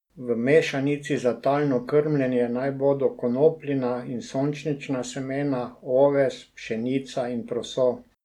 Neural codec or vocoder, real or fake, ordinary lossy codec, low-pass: none; real; none; 19.8 kHz